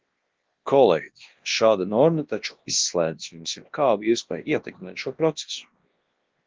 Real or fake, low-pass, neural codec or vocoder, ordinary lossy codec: fake; 7.2 kHz; codec, 24 kHz, 0.9 kbps, WavTokenizer, large speech release; Opus, 16 kbps